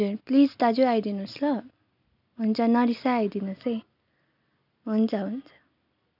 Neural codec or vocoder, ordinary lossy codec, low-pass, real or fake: none; none; 5.4 kHz; real